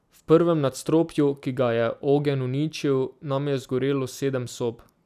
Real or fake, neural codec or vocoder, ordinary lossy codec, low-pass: real; none; none; 14.4 kHz